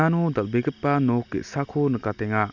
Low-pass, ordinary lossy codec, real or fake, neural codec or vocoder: 7.2 kHz; none; real; none